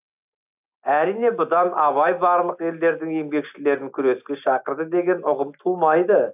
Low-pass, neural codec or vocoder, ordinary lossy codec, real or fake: 3.6 kHz; none; AAC, 32 kbps; real